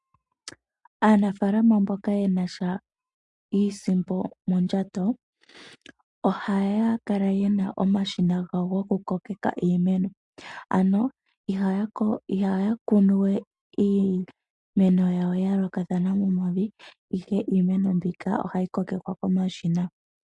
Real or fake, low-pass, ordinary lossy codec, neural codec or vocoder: real; 10.8 kHz; MP3, 64 kbps; none